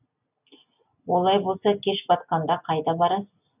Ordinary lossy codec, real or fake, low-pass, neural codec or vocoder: none; real; 3.6 kHz; none